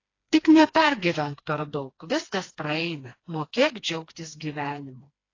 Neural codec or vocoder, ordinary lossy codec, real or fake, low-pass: codec, 16 kHz, 2 kbps, FreqCodec, smaller model; AAC, 32 kbps; fake; 7.2 kHz